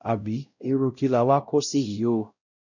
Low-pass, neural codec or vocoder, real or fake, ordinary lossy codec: 7.2 kHz; codec, 16 kHz, 0.5 kbps, X-Codec, WavLM features, trained on Multilingual LibriSpeech; fake; none